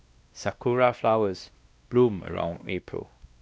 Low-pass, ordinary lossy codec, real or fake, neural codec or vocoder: none; none; fake; codec, 16 kHz, 1 kbps, X-Codec, WavLM features, trained on Multilingual LibriSpeech